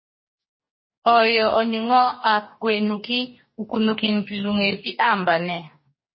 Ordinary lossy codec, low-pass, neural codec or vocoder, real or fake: MP3, 24 kbps; 7.2 kHz; codec, 44.1 kHz, 2.6 kbps, DAC; fake